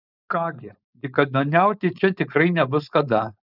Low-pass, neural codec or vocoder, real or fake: 5.4 kHz; codec, 16 kHz, 4.8 kbps, FACodec; fake